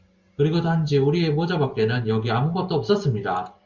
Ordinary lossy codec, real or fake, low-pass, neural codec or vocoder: Opus, 64 kbps; real; 7.2 kHz; none